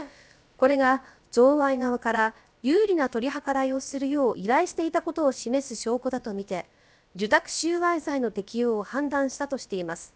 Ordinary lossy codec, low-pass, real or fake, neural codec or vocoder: none; none; fake; codec, 16 kHz, about 1 kbps, DyCAST, with the encoder's durations